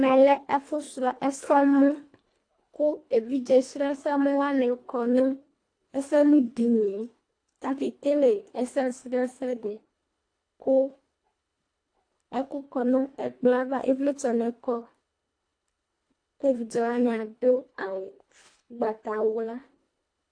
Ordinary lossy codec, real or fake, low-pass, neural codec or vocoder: AAC, 48 kbps; fake; 9.9 kHz; codec, 24 kHz, 1.5 kbps, HILCodec